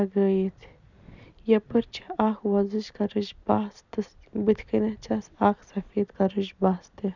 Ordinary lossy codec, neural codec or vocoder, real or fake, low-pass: none; none; real; 7.2 kHz